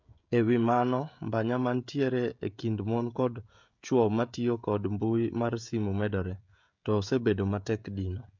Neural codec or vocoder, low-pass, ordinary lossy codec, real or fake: codec, 16 kHz, 16 kbps, FreqCodec, smaller model; 7.2 kHz; MP3, 64 kbps; fake